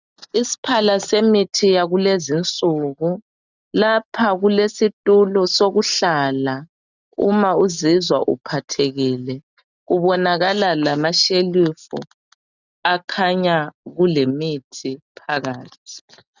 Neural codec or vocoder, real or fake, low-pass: none; real; 7.2 kHz